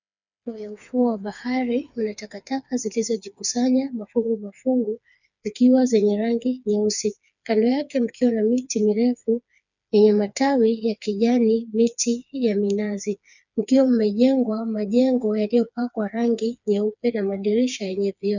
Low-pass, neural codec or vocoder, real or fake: 7.2 kHz; codec, 16 kHz, 4 kbps, FreqCodec, smaller model; fake